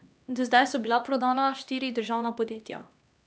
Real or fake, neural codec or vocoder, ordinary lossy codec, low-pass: fake; codec, 16 kHz, 2 kbps, X-Codec, HuBERT features, trained on LibriSpeech; none; none